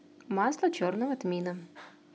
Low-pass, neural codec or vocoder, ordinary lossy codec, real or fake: none; none; none; real